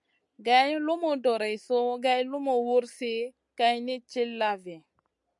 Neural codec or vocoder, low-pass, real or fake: none; 10.8 kHz; real